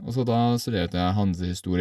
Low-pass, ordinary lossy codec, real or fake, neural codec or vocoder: 14.4 kHz; none; real; none